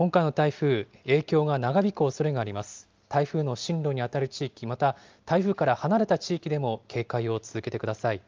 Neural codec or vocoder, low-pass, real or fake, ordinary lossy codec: none; 7.2 kHz; real; Opus, 32 kbps